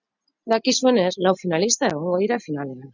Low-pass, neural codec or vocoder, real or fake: 7.2 kHz; none; real